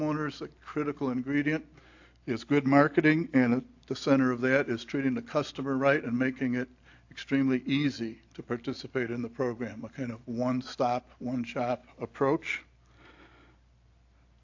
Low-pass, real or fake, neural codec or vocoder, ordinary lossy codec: 7.2 kHz; real; none; AAC, 48 kbps